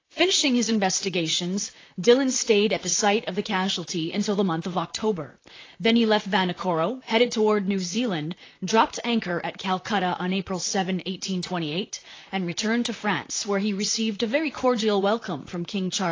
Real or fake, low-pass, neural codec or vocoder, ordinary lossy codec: fake; 7.2 kHz; vocoder, 44.1 kHz, 128 mel bands, Pupu-Vocoder; AAC, 32 kbps